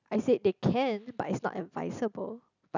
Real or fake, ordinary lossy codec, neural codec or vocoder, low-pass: real; none; none; 7.2 kHz